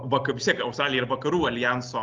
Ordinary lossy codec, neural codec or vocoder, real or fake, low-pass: Opus, 24 kbps; none; real; 7.2 kHz